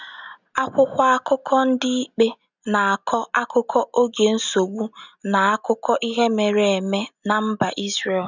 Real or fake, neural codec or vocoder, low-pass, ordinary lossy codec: real; none; 7.2 kHz; none